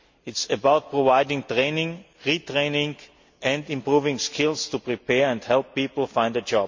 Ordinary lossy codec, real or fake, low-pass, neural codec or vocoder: MP3, 48 kbps; real; 7.2 kHz; none